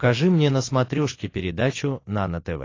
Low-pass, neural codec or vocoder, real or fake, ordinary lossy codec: 7.2 kHz; none; real; AAC, 32 kbps